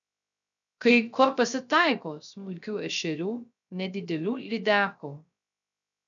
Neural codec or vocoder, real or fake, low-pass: codec, 16 kHz, 0.3 kbps, FocalCodec; fake; 7.2 kHz